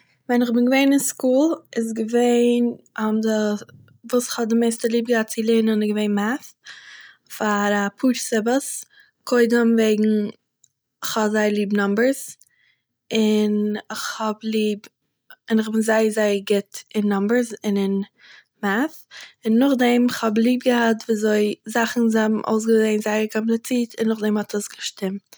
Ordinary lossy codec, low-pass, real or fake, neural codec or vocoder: none; none; real; none